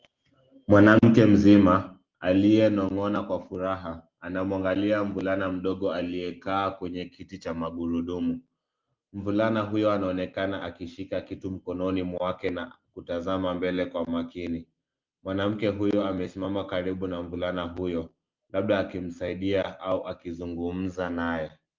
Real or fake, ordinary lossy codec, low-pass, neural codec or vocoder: real; Opus, 32 kbps; 7.2 kHz; none